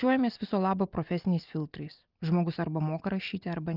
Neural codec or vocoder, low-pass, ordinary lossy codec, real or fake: none; 5.4 kHz; Opus, 24 kbps; real